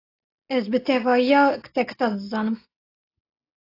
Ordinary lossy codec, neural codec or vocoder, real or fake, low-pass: AAC, 32 kbps; none; real; 5.4 kHz